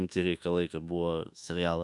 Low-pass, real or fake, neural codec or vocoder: 10.8 kHz; fake; codec, 24 kHz, 1.2 kbps, DualCodec